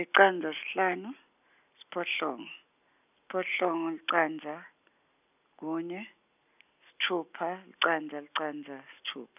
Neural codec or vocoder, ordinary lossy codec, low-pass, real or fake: none; none; 3.6 kHz; real